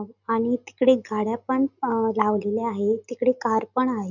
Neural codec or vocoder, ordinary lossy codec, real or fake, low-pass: none; none; real; 7.2 kHz